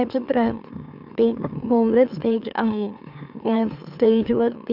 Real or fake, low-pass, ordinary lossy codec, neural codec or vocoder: fake; 5.4 kHz; none; autoencoder, 44.1 kHz, a latent of 192 numbers a frame, MeloTTS